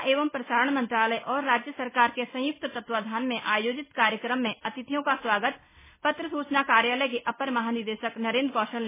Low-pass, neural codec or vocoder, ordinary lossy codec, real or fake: 3.6 kHz; none; MP3, 16 kbps; real